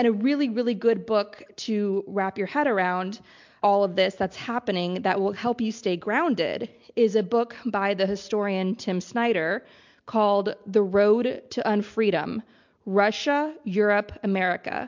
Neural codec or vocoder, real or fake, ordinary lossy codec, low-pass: none; real; MP3, 64 kbps; 7.2 kHz